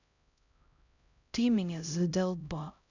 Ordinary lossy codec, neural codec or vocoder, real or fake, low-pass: none; codec, 16 kHz, 0.5 kbps, X-Codec, HuBERT features, trained on LibriSpeech; fake; 7.2 kHz